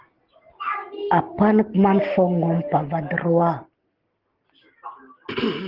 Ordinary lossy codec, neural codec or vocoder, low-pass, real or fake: Opus, 16 kbps; none; 5.4 kHz; real